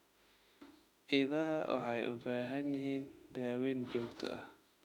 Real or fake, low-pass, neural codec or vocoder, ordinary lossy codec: fake; 19.8 kHz; autoencoder, 48 kHz, 32 numbers a frame, DAC-VAE, trained on Japanese speech; none